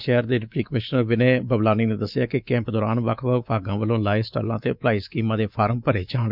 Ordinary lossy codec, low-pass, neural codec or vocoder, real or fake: none; 5.4 kHz; codec, 24 kHz, 3.1 kbps, DualCodec; fake